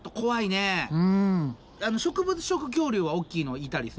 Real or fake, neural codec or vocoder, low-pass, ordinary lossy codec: real; none; none; none